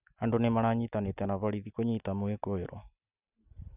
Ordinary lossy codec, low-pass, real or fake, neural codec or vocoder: none; 3.6 kHz; real; none